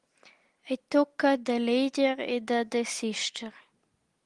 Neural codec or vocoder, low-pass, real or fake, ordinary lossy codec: none; 10.8 kHz; real; Opus, 24 kbps